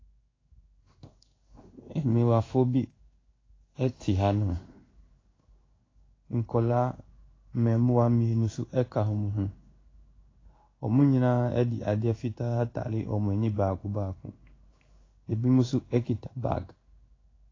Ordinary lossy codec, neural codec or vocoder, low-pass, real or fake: AAC, 32 kbps; codec, 16 kHz in and 24 kHz out, 1 kbps, XY-Tokenizer; 7.2 kHz; fake